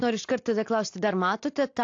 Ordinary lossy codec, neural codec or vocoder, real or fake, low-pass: AAC, 48 kbps; none; real; 7.2 kHz